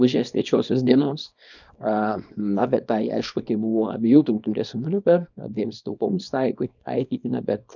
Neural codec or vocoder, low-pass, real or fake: codec, 24 kHz, 0.9 kbps, WavTokenizer, small release; 7.2 kHz; fake